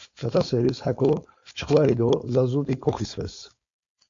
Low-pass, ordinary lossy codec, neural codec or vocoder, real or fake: 7.2 kHz; AAC, 48 kbps; codec, 16 kHz, 4.8 kbps, FACodec; fake